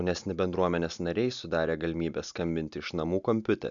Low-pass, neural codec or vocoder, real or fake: 7.2 kHz; none; real